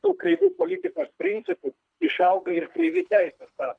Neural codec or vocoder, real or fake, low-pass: codec, 24 kHz, 3 kbps, HILCodec; fake; 9.9 kHz